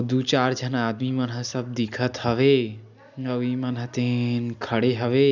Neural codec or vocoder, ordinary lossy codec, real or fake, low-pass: none; none; real; 7.2 kHz